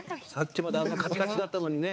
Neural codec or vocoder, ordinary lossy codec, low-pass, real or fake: codec, 16 kHz, 4 kbps, X-Codec, HuBERT features, trained on balanced general audio; none; none; fake